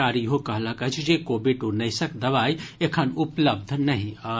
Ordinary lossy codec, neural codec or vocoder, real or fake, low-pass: none; none; real; none